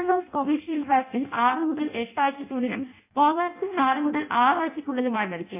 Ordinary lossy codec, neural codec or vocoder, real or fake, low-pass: AAC, 32 kbps; codec, 16 kHz in and 24 kHz out, 0.6 kbps, FireRedTTS-2 codec; fake; 3.6 kHz